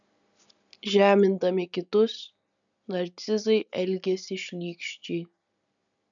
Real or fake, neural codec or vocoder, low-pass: real; none; 7.2 kHz